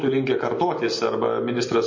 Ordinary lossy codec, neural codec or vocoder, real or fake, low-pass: MP3, 32 kbps; none; real; 7.2 kHz